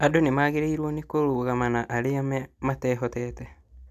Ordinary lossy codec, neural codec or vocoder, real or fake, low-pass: none; none; real; 14.4 kHz